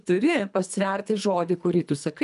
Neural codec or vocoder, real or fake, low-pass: codec, 24 kHz, 3 kbps, HILCodec; fake; 10.8 kHz